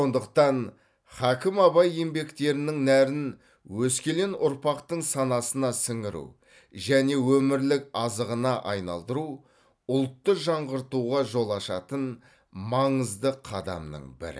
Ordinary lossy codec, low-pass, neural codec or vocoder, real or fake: none; none; none; real